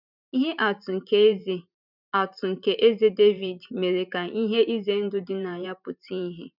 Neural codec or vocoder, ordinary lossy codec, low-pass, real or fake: vocoder, 44.1 kHz, 128 mel bands every 512 samples, BigVGAN v2; none; 5.4 kHz; fake